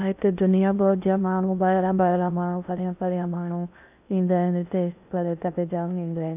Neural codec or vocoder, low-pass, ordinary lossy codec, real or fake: codec, 16 kHz in and 24 kHz out, 0.6 kbps, FocalCodec, streaming, 2048 codes; 3.6 kHz; none; fake